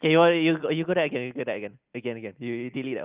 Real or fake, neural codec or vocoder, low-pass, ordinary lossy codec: real; none; 3.6 kHz; Opus, 32 kbps